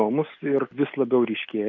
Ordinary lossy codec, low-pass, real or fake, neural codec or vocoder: MP3, 48 kbps; 7.2 kHz; real; none